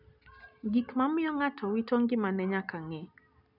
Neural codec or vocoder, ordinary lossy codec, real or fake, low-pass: none; none; real; 5.4 kHz